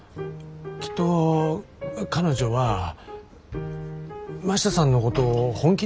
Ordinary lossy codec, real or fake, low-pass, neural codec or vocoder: none; real; none; none